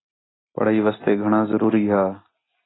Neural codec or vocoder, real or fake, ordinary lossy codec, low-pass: none; real; AAC, 16 kbps; 7.2 kHz